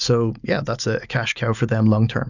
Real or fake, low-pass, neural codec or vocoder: real; 7.2 kHz; none